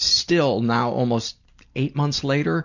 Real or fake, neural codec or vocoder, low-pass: real; none; 7.2 kHz